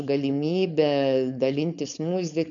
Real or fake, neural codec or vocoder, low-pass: fake; codec, 16 kHz, 4.8 kbps, FACodec; 7.2 kHz